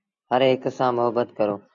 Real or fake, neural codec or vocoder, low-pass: real; none; 7.2 kHz